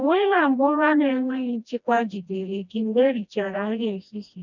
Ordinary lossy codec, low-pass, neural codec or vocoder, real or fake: none; 7.2 kHz; codec, 16 kHz, 1 kbps, FreqCodec, smaller model; fake